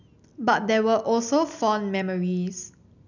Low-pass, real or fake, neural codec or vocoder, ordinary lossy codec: 7.2 kHz; real; none; none